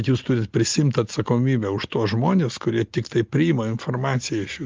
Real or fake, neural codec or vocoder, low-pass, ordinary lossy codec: real; none; 7.2 kHz; Opus, 32 kbps